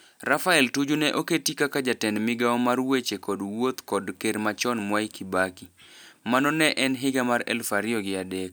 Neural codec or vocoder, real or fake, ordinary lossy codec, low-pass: none; real; none; none